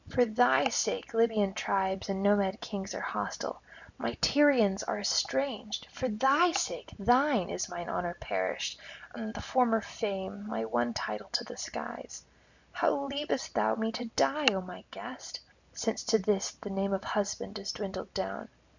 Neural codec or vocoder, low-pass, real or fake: none; 7.2 kHz; real